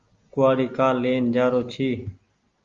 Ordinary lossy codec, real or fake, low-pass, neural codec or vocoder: Opus, 32 kbps; real; 7.2 kHz; none